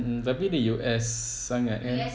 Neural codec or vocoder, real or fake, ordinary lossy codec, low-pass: none; real; none; none